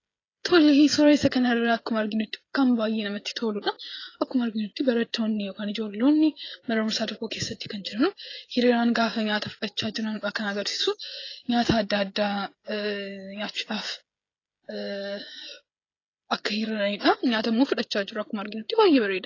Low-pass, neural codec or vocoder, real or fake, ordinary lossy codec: 7.2 kHz; codec, 16 kHz, 16 kbps, FreqCodec, smaller model; fake; AAC, 32 kbps